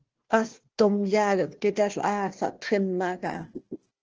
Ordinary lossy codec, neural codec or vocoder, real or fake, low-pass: Opus, 16 kbps; codec, 16 kHz, 1 kbps, FunCodec, trained on Chinese and English, 50 frames a second; fake; 7.2 kHz